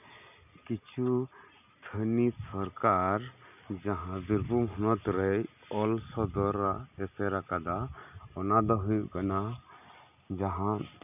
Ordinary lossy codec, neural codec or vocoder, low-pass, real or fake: none; none; 3.6 kHz; real